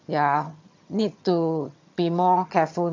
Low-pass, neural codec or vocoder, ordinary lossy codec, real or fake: 7.2 kHz; vocoder, 22.05 kHz, 80 mel bands, HiFi-GAN; MP3, 48 kbps; fake